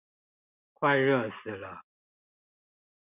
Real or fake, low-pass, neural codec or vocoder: real; 3.6 kHz; none